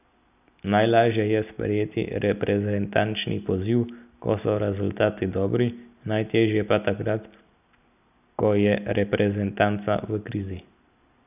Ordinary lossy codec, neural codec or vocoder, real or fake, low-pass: none; none; real; 3.6 kHz